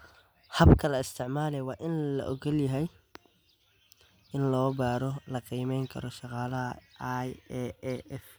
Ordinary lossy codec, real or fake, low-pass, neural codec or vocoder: none; real; none; none